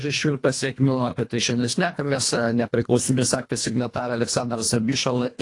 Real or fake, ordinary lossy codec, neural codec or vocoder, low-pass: fake; AAC, 48 kbps; codec, 24 kHz, 1.5 kbps, HILCodec; 10.8 kHz